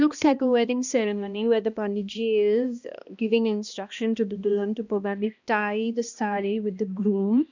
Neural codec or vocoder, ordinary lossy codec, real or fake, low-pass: codec, 16 kHz, 1 kbps, X-Codec, HuBERT features, trained on balanced general audio; none; fake; 7.2 kHz